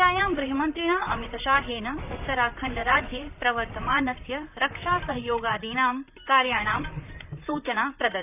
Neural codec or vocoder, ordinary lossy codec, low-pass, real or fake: vocoder, 44.1 kHz, 128 mel bands, Pupu-Vocoder; none; 3.6 kHz; fake